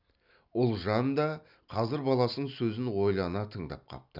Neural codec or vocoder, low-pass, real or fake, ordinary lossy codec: none; 5.4 kHz; real; none